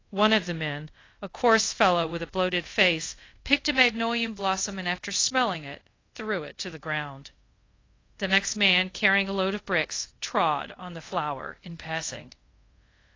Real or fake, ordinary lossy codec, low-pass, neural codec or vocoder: fake; AAC, 32 kbps; 7.2 kHz; codec, 24 kHz, 0.5 kbps, DualCodec